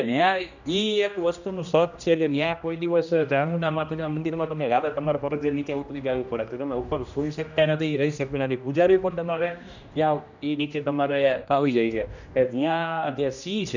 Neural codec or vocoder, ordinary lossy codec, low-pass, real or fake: codec, 16 kHz, 1 kbps, X-Codec, HuBERT features, trained on general audio; none; 7.2 kHz; fake